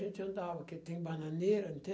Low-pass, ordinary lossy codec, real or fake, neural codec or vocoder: none; none; real; none